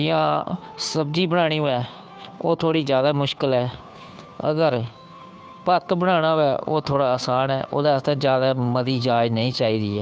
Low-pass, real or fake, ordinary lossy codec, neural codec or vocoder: none; fake; none; codec, 16 kHz, 2 kbps, FunCodec, trained on Chinese and English, 25 frames a second